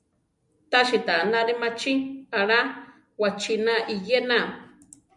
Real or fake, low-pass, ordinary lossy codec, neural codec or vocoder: real; 10.8 kHz; MP3, 96 kbps; none